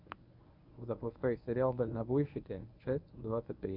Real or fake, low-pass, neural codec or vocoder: fake; 5.4 kHz; codec, 24 kHz, 0.9 kbps, WavTokenizer, medium speech release version 1